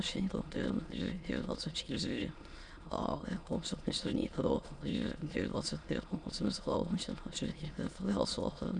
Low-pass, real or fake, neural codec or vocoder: 9.9 kHz; fake; autoencoder, 22.05 kHz, a latent of 192 numbers a frame, VITS, trained on many speakers